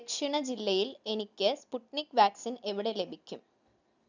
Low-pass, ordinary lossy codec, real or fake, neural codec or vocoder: 7.2 kHz; none; real; none